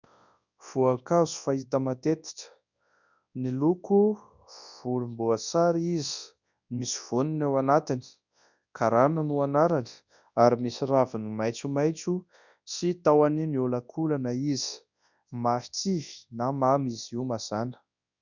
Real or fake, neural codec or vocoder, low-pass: fake; codec, 24 kHz, 0.9 kbps, WavTokenizer, large speech release; 7.2 kHz